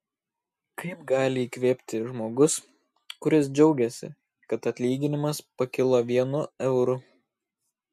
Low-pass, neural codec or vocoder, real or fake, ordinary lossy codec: 14.4 kHz; none; real; MP3, 64 kbps